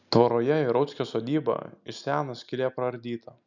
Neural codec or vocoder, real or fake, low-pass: none; real; 7.2 kHz